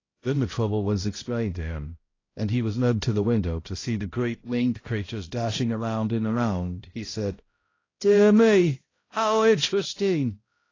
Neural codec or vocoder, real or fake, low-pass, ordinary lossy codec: codec, 16 kHz, 0.5 kbps, X-Codec, HuBERT features, trained on balanced general audio; fake; 7.2 kHz; AAC, 32 kbps